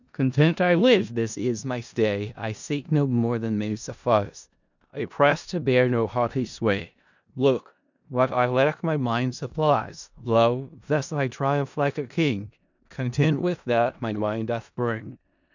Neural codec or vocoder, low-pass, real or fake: codec, 16 kHz in and 24 kHz out, 0.4 kbps, LongCat-Audio-Codec, four codebook decoder; 7.2 kHz; fake